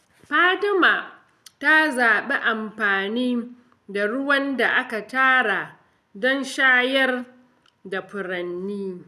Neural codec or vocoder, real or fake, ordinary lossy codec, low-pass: none; real; none; 14.4 kHz